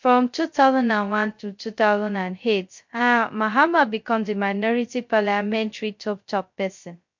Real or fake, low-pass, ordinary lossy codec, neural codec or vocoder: fake; 7.2 kHz; MP3, 48 kbps; codec, 16 kHz, 0.2 kbps, FocalCodec